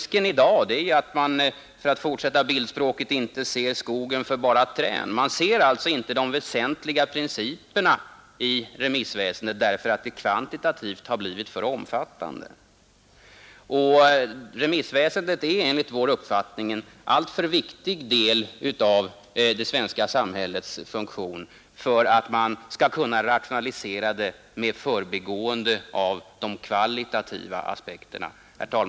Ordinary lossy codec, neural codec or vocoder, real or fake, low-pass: none; none; real; none